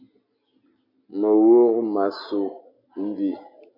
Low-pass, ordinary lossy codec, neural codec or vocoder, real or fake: 5.4 kHz; MP3, 32 kbps; none; real